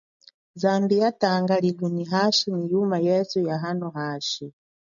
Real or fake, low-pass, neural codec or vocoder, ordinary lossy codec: real; 7.2 kHz; none; MP3, 96 kbps